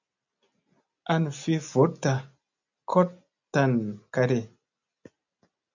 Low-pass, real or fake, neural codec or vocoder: 7.2 kHz; real; none